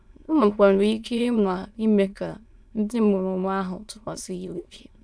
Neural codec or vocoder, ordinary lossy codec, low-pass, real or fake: autoencoder, 22.05 kHz, a latent of 192 numbers a frame, VITS, trained on many speakers; none; none; fake